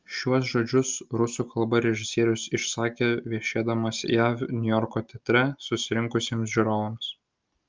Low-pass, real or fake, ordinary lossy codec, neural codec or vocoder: 7.2 kHz; real; Opus, 24 kbps; none